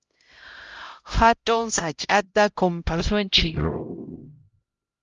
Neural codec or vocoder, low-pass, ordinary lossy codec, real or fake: codec, 16 kHz, 0.5 kbps, X-Codec, HuBERT features, trained on LibriSpeech; 7.2 kHz; Opus, 32 kbps; fake